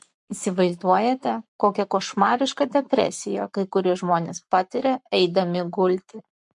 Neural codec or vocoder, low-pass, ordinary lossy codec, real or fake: codec, 44.1 kHz, 7.8 kbps, Pupu-Codec; 10.8 kHz; MP3, 64 kbps; fake